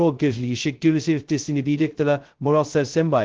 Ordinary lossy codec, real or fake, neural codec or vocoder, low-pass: Opus, 16 kbps; fake; codec, 16 kHz, 0.2 kbps, FocalCodec; 7.2 kHz